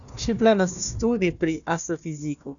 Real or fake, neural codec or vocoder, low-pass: fake; codec, 16 kHz, 1 kbps, FunCodec, trained on Chinese and English, 50 frames a second; 7.2 kHz